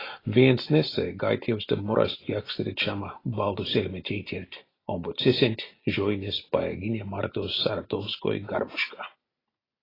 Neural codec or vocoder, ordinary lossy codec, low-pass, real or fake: none; AAC, 24 kbps; 5.4 kHz; real